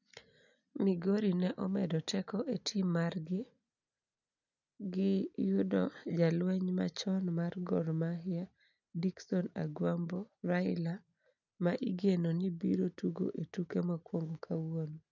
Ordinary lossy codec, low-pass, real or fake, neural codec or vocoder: none; 7.2 kHz; real; none